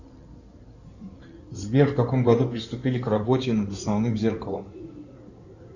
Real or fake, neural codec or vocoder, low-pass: fake; codec, 16 kHz in and 24 kHz out, 2.2 kbps, FireRedTTS-2 codec; 7.2 kHz